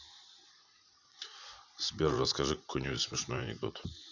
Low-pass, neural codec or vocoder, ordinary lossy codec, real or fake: 7.2 kHz; vocoder, 44.1 kHz, 80 mel bands, Vocos; none; fake